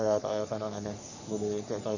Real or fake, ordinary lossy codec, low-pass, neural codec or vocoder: fake; none; 7.2 kHz; codec, 44.1 kHz, 3.4 kbps, Pupu-Codec